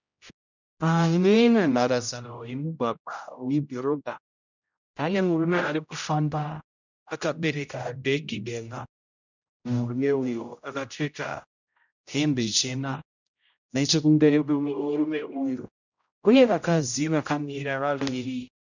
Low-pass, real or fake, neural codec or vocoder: 7.2 kHz; fake; codec, 16 kHz, 0.5 kbps, X-Codec, HuBERT features, trained on general audio